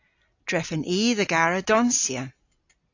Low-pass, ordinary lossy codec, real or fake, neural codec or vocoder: 7.2 kHz; AAC, 48 kbps; real; none